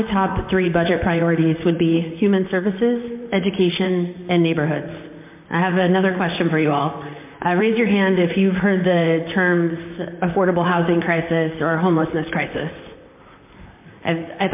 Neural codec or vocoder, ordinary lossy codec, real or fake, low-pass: vocoder, 44.1 kHz, 128 mel bands, Pupu-Vocoder; MP3, 32 kbps; fake; 3.6 kHz